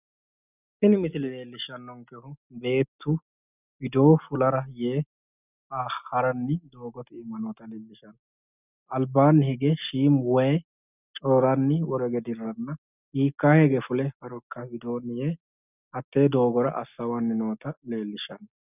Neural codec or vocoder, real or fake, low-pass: none; real; 3.6 kHz